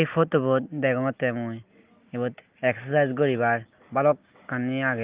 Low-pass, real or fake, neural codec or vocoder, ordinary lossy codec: 3.6 kHz; real; none; Opus, 32 kbps